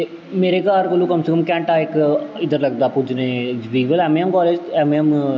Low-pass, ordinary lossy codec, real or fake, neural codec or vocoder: none; none; real; none